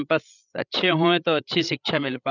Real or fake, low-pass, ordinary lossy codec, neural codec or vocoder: fake; 7.2 kHz; none; codec, 16 kHz, 16 kbps, FreqCodec, larger model